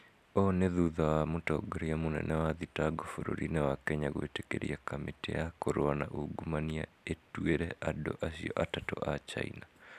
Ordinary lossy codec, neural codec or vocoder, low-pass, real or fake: none; none; 14.4 kHz; real